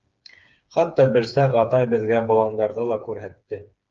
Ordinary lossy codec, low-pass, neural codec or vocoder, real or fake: Opus, 24 kbps; 7.2 kHz; codec, 16 kHz, 4 kbps, FreqCodec, smaller model; fake